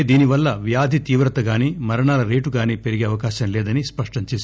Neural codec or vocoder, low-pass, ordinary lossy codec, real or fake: none; none; none; real